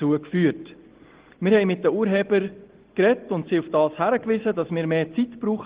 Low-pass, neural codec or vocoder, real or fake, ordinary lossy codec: 3.6 kHz; none; real; Opus, 16 kbps